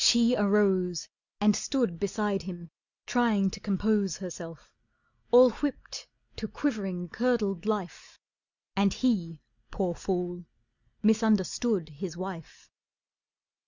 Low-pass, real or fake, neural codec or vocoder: 7.2 kHz; real; none